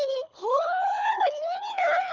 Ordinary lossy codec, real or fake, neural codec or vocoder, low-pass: Opus, 64 kbps; fake; codec, 24 kHz, 3 kbps, HILCodec; 7.2 kHz